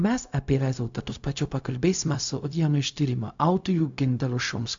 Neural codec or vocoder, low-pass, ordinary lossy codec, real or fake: codec, 16 kHz, 0.4 kbps, LongCat-Audio-Codec; 7.2 kHz; AAC, 64 kbps; fake